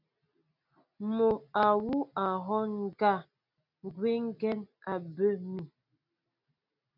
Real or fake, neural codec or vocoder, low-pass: real; none; 5.4 kHz